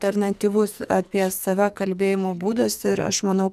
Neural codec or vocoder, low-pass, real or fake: codec, 44.1 kHz, 2.6 kbps, SNAC; 14.4 kHz; fake